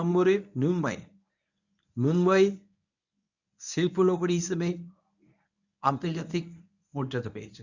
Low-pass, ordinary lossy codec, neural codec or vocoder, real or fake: 7.2 kHz; none; codec, 24 kHz, 0.9 kbps, WavTokenizer, medium speech release version 1; fake